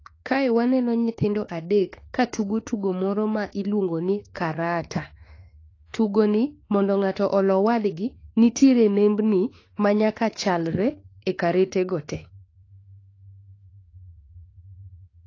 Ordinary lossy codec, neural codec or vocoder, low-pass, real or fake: AAC, 32 kbps; autoencoder, 48 kHz, 32 numbers a frame, DAC-VAE, trained on Japanese speech; 7.2 kHz; fake